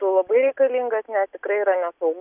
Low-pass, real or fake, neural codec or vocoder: 3.6 kHz; real; none